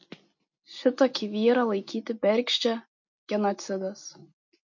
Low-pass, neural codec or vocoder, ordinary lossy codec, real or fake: 7.2 kHz; none; MP3, 48 kbps; real